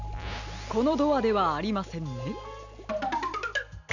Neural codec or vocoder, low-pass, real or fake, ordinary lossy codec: none; 7.2 kHz; real; none